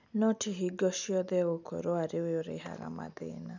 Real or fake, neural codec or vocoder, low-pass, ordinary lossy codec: real; none; 7.2 kHz; none